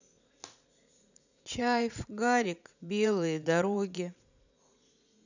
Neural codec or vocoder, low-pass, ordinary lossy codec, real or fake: vocoder, 44.1 kHz, 80 mel bands, Vocos; 7.2 kHz; none; fake